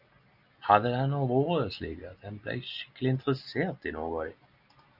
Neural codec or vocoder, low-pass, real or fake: none; 5.4 kHz; real